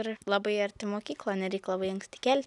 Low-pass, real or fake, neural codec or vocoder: 10.8 kHz; real; none